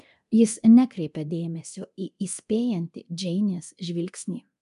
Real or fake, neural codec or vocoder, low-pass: fake; codec, 24 kHz, 0.9 kbps, DualCodec; 10.8 kHz